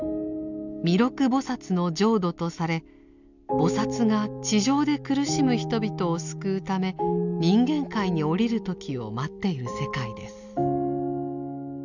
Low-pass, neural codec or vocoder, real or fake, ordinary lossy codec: 7.2 kHz; none; real; none